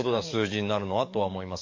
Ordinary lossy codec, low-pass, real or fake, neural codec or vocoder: MP3, 48 kbps; 7.2 kHz; fake; codec, 44.1 kHz, 7.8 kbps, DAC